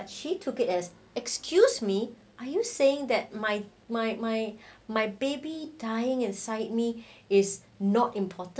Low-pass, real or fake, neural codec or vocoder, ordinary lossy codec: none; real; none; none